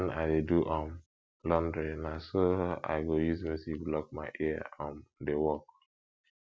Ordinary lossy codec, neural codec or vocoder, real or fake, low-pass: none; none; real; none